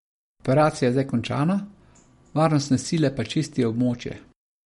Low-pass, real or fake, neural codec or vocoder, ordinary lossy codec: 19.8 kHz; real; none; MP3, 48 kbps